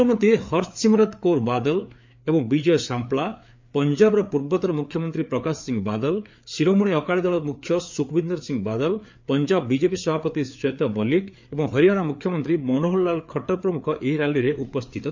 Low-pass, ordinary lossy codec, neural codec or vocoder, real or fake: 7.2 kHz; MP3, 64 kbps; codec, 16 kHz, 4 kbps, FreqCodec, larger model; fake